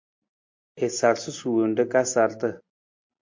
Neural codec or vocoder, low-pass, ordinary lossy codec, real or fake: none; 7.2 kHz; MP3, 48 kbps; real